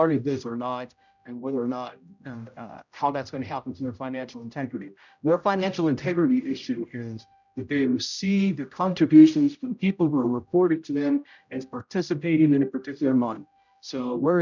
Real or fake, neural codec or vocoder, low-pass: fake; codec, 16 kHz, 0.5 kbps, X-Codec, HuBERT features, trained on general audio; 7.2 kHz